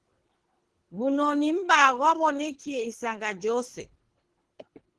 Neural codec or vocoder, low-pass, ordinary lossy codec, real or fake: codec, 24 kHz, 3 kbps, HILCodec; 10.8 kHz; Opus, 16 kbps; fake